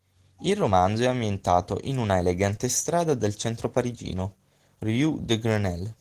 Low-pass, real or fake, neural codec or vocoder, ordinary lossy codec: 14.4 kHz; real; none; Opus, 16 kbps